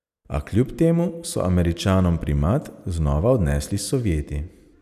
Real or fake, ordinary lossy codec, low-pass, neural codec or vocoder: real; none; 14.4 kHz; none